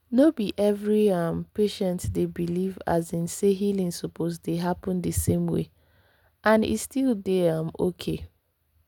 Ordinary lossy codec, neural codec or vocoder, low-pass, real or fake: none; none; 19.8 kHz; real